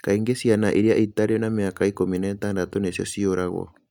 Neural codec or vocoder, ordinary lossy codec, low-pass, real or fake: none; none; 19.8 kHz; real